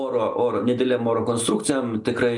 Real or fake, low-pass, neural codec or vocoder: real; 10.8 kHz; none